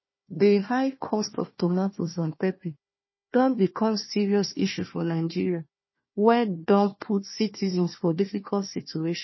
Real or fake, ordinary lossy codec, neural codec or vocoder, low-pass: fake; MP3, 24 kbps; codec, 16 kHz, 1 kbps, FunCodec, trained on Chinese and English, 50 frames a second; 7.2 kHz